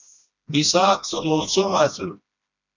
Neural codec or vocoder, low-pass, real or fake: codec, 16 kHz, 1 kbps, FreqCodec, smaller model; 7.2 kHz; fake